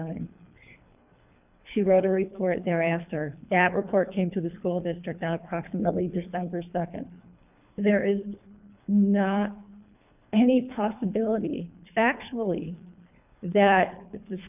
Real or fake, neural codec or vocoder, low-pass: fake; codec, 24 kHz, 3 kbps, HILCodec; 3.6 kHz